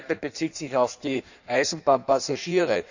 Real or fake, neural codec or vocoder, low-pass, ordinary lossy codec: fake; codec, 16 kHz in and 24 kHz out, 1.1 kbps, FireRedTTS-2 codec; 7.2 kHz; none